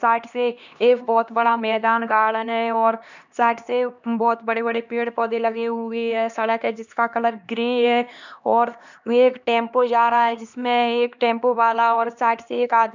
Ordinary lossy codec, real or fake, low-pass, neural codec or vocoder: none; fake; 7.2 kHz; codec, 16 kHz, 2 kbps, X-Codec, HuBERT features, trained on LibriSpeech